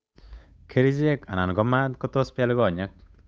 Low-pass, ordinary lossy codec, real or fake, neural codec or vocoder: none; none; fake; codec, 16 kHz, 8 kbps, FunCodec, trained on Chinese and English, 25 frames a second